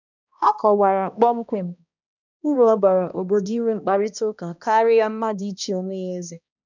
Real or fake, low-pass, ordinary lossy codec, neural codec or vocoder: fake; 7.2 kHz; none; codec, 16 kHz, 1 kbps, X-Codec, HuBERT features, trained on balanced general audio